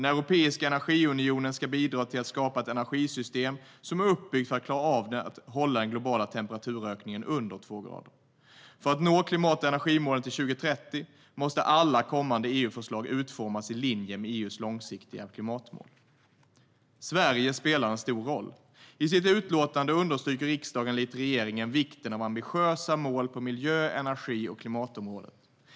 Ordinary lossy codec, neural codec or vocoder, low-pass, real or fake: none; none; none; real